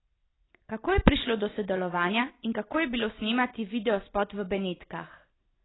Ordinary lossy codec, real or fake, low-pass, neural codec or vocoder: AAC, 16 kbps; real; 7.2 kHz; none